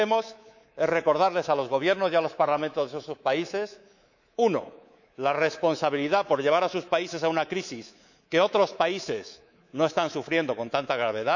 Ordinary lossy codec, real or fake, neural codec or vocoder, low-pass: none; fake; codec, 24 kHz, 3.1 kbps, DualCodec; 7.2 kHz